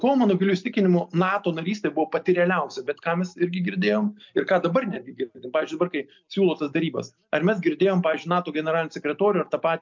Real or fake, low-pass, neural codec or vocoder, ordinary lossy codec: real; 7.2 kHz; none; MP3, 64 kbps